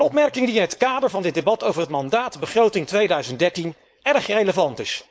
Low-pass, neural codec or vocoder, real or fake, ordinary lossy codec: none; codec, 16 kHz, 4.8 kbps, FACodec; fake; none